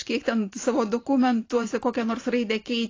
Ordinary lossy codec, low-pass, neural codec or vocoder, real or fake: AAC, 32 kbps; 7.2 kHz; vocoder, 44.1 kHz, 128 mel bands every 512 samples, BigVGAN v2; fake